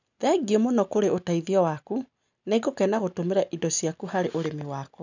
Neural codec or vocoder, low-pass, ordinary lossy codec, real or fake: none; 7.2 kHz; none; real